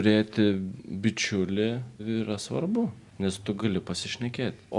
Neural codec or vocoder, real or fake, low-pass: none; real; 10.8 kHz